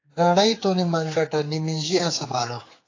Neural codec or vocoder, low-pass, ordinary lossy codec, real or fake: codec, 16 kHz, 4 kbps, X-Codec, HuBERT features, trained on general audio; 7.2 kHz; AAC, 32 kbps; fake